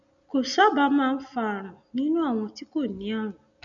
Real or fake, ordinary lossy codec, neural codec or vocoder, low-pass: real; none; none; 7.2 kHz